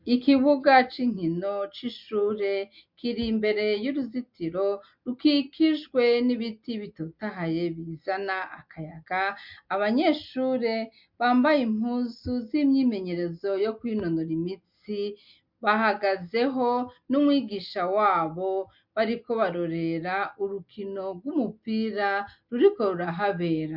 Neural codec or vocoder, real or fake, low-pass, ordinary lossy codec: none; real; 5.4 kHz; MP3, 48 kbps